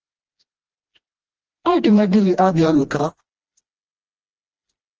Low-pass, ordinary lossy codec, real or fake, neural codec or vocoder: 7.2 kHz; Opus, 24 kbps; fake; codec, 16 kHz, 1 kbps, FreqCodec, smaller model